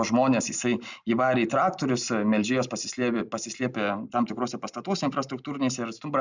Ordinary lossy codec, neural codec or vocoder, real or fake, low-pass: Opus, 64 kbps; none; real; 7.2 kHz